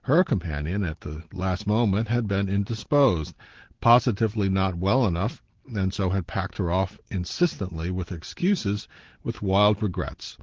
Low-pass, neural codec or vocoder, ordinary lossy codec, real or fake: 7.2 kHz; none; Opus, 16 kbps; real